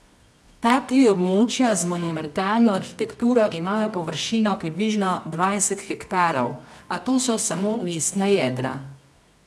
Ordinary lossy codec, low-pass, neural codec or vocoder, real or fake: none; none; codec, 24 kHz, 0.9 kbps, WavTokenizer, medium music audio release; fake